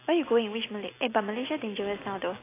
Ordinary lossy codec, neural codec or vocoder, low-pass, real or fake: AAC, 16 kbps; none; 3.6 kHz; real